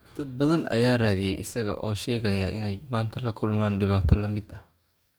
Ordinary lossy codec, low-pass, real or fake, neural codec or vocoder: none; none; fake; codec, 44.1 kHz, 2.6 kbps, DAC